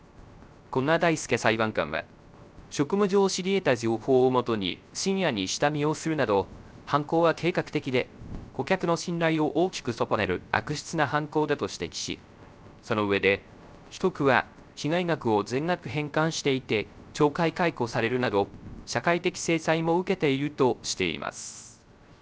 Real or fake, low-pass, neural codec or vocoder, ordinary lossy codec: fake; none; codec, 16 kHz, 0.3 kbps, FocalCodec; none